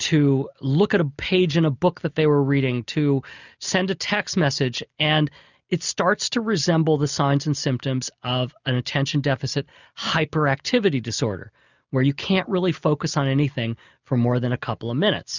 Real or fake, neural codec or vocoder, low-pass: real; none; 7.2 kHz